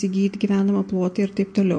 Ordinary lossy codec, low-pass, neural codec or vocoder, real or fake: MP3, 48 kbps; 9.9 kHz; none; real